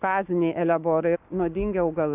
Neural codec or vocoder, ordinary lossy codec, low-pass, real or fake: codec, 44.1 kHz, 7.8 kbps, Pupu-Codec; AAC, 32 kbps; 3.6 kHz; fake